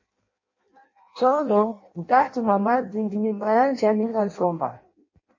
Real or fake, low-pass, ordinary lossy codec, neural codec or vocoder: fake; 7.2 kHz; MP3, 32 kbps; codec, 16 kHz in and 24 kHz out, 0.6 kbps, FireRedTTS-2 codec